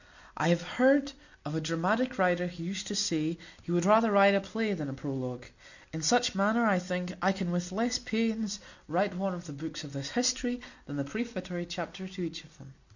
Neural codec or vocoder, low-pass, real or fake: none; 7.2 kHz; real